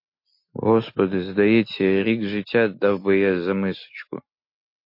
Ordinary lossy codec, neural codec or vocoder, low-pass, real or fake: MP3, 32 kbps; none; 5.4 kHz; real